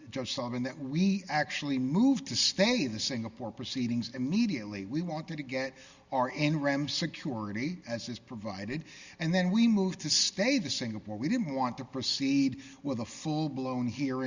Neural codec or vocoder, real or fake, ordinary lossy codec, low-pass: none; real; Opus, 64 kbps; 7.2 kHz